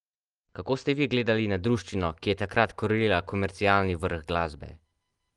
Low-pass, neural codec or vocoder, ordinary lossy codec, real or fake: 10.8 kHz; none; Opus, 24 kbps; real